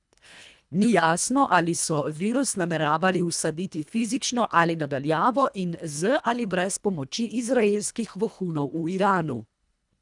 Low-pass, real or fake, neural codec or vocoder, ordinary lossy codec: 10.8 kHz; fake; codec, 24 kHz, 1.5 kbps, HILCodec; none